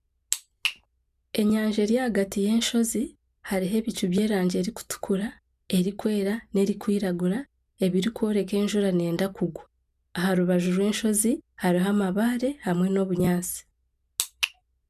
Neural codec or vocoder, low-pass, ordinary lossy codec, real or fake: none; 14.4 kHz; none; real